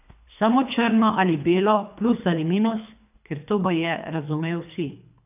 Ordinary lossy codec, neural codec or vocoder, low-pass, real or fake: none; codec, 24 kHz, 3 kbps, HILCodec; 3.6 kHz; fake